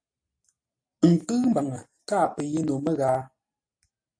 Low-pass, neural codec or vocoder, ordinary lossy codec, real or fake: 9.9 kHz; none; AAC, 64 kbps; real